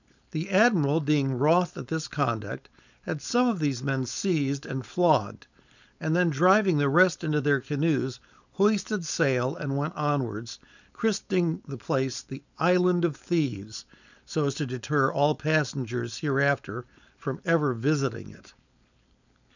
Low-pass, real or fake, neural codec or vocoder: 7.2 kHz; fake; codec, 16 kHz, 4.8 kbps, FACodec